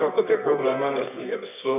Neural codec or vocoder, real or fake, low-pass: codec, 24 kHz, 0.9 kbps, WavTokenizer, medium music audio release; fake; 3.6 kHz